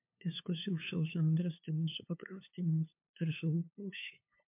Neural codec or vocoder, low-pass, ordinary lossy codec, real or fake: codec, 16 kHz, 2 kbps, FunCodec, trained on LibriTTS, 25 frames a second; 3.6 kHz; MP3, 32 kbps; fake